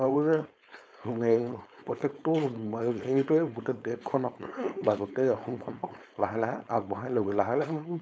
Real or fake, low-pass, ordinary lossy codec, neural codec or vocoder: fake; none; none; codec, 16 kHz, 4.8 kbps, FACodec